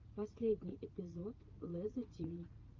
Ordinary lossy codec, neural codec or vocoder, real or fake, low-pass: Opus, 24 kbps; vocoder, 44.1 kHz, 80 mel bands, Vocos; fake; 7.2 kHz